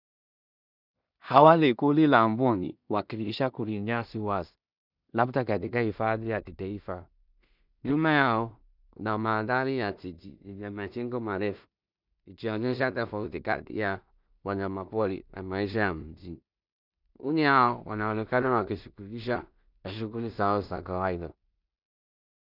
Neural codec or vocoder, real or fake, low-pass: codec, 16 kHz in and 24 kHz out, 0.4 kbps, LongCat-Audio-Codec, two codebook decoder; fake; 5.4 kHz